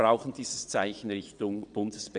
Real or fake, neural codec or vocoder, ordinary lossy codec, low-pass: fake; vocoder, 22.05 kHz, 80 mel bands, WaveNeXt; none; 9.9 kHz